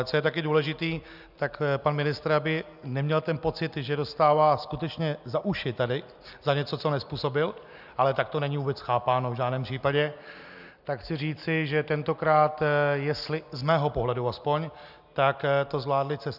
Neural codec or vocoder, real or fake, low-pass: none; real; 5.4 kHz